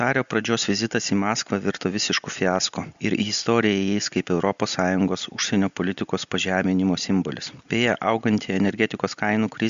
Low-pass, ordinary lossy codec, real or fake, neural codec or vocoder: 7.2 kHz; Opus, 64 kbps; real; none